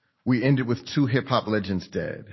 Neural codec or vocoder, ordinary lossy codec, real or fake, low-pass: none; MP3, 24 kbps; real; 7.2 kHz